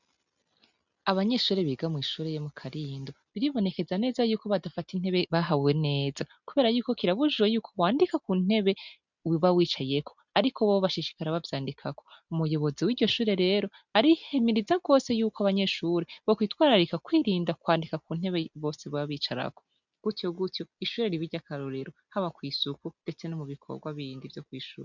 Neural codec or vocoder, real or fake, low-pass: none; real; 7.2 kHz